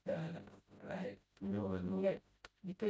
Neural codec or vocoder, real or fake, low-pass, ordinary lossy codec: codec, 16 kHz, 0.5 kbps, FreqCodec, smaller model; fake; none; none